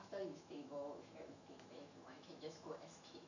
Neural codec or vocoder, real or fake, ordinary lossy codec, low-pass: autoencoder, 48 kHz, 128 numbers a frame, DAC-VAE, trained on Japanese speech; fake; none; 7.2 kHz